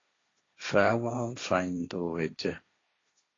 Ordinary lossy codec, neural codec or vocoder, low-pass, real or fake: AAC, 32 kbps; codec, 16 kHz, 1.1 kbps, Voila-Tokenizer; 7.2 kHz; fake